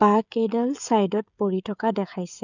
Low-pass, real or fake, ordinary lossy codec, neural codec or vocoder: 7.2 kHz; fake; none; codec, 16 kHz, 16 kbps, FreqCodec, smaller model